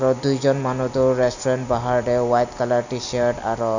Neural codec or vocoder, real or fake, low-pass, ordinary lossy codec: none; real; 7.2 kHz; none